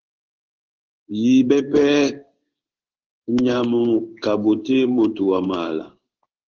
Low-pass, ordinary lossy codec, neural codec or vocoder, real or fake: 7.2 kHz; Opus, 16 kbps; codec, 16 kHz in and 24 kHz out, 1 kbps, XY-Tokenizer; fake